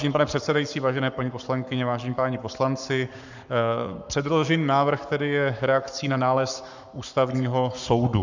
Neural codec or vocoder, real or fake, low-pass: codec, 44.1 kHz, 7.8 kbps, Pupu-Codec; fake; 7.2 kHz